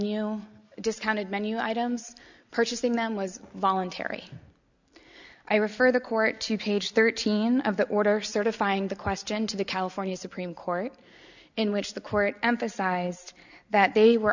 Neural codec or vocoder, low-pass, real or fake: none; 7.2 kHz; real